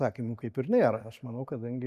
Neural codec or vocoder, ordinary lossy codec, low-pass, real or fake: none; MP3, 96 kbps; 14.4 kHz; real